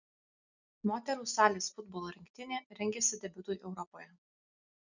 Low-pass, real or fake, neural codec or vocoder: 7.2 kHz; real; none